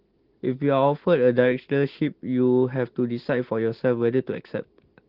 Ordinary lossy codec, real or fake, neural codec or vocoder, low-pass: Opus, 16 kbps; real; none; 5.4 kHz